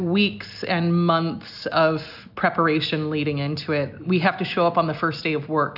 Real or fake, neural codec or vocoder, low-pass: real; none; 5.4 kHz